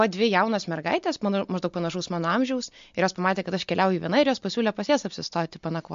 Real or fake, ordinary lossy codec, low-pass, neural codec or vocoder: real; MP3, 48 kbps; 7.2 kHz; none